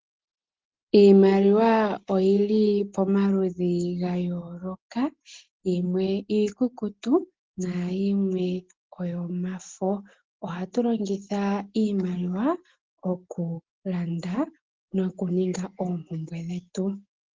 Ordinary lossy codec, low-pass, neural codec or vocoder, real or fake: Opus, 16 kbps; 7.2 kHz; none; real